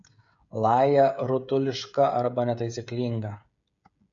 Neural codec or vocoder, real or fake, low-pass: codec, 16 kHz, 16 kbps, FreqCodec, smaller model; fake; 7.2 kHz